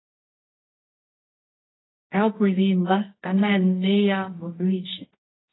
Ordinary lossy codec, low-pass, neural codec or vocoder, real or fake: AAC, 16 kbps; 7.2 kHz; codec, 24 kHz, 0.9 kbps, WavTokenizer, medium music audio release; fake